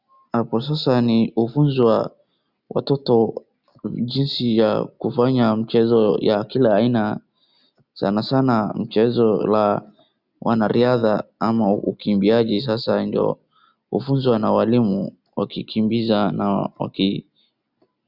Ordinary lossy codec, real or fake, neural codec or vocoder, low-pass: Opus, 64 kbps; real; none; 5.4 kHz